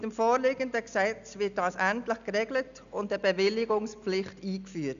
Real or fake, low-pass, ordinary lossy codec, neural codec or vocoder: real; 7.2 kHz; none; none